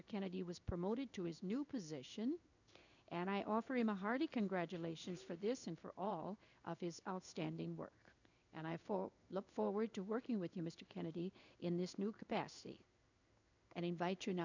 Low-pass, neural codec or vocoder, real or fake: 7.2 kHz; codec, 16 kHz in and 24 kHz out, 1 kbps, XY-Tokenizer; fake